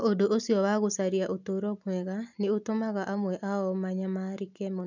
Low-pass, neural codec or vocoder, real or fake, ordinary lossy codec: 7.2 kHz; none; real; none